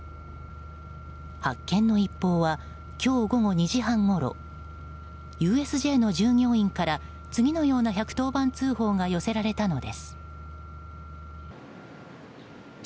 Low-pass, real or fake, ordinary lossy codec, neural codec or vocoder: none; real; none; none